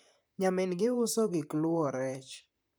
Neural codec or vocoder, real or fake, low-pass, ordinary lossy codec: vocoder, 44.1 kHz, 128 mel bands, Pupu-Vocoder; fake; none; none